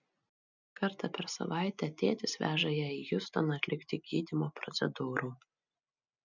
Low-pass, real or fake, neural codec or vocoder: 7.2 kHz; real; none